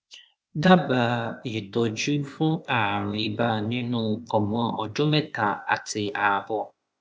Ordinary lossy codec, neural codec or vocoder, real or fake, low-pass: none; codec, 16 kHz, 0.8 kbps, ZipCodec; fake; none